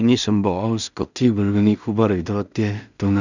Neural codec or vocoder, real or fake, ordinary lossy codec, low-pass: codec, 16 kHz in and 24 kHz out, 0.4 kbps, LongCat-Audio-Codec, two codebook decoder; fake; none; 7.2 kHz